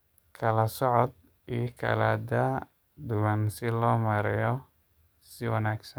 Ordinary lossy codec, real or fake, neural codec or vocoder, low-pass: none; fake; codec, 44.1 kHz, 7.8 kbps, DAC; none